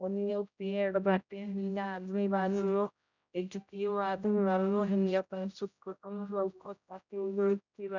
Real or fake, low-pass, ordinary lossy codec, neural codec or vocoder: fake; 7.2 kHz; none; codec, 16 kHz, 0.5 kbps, X-Codec, HuBERT features, trained on general audio